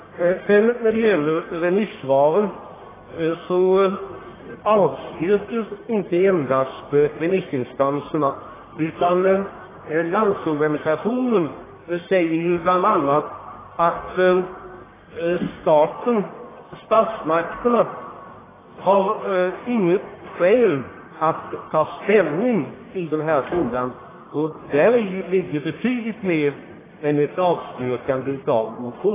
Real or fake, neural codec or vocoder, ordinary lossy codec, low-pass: fake; codec, 44.1 kHz, 1.7 kbps, Pupu-Codec; AAC, 16 kbps; 3.6 kHz